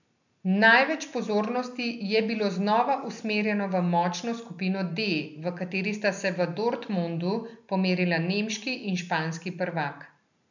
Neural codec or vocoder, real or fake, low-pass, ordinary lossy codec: none; real; 7.2 kHz; none